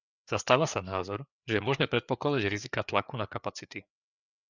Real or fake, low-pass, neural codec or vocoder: fake; 7.2 kHz; codec, 16 kHz, 4 kbps, FreqCodec, larger model